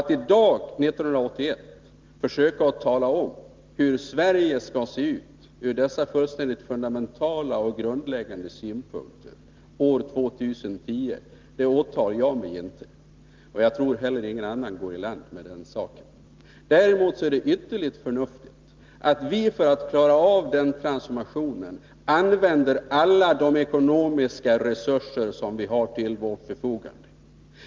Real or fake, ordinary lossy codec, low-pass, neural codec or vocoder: real; Opus, 32 kbps; 7.2 kHz; none